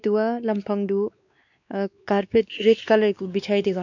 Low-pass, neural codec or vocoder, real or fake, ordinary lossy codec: 7.2 kHz; codec, 16 kHz, 2 kbps, X-Codec, WavLM features, trained on Multilingual LibriSpeech; fake; none